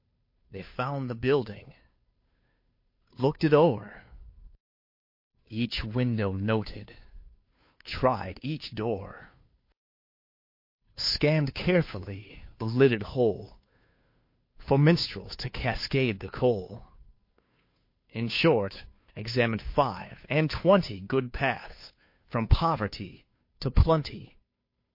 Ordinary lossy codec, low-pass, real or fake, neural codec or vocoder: MP3, 32 kbps; 5.4 kHz; fake; codec, 16 kHz, 2 kbps, FunCodec, trained on Chinese and English, 25 frames a second